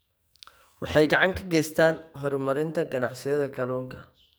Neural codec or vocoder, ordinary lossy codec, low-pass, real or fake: codec, 44.1 kHz, 2.6 kbps, SNAC; none; none; fake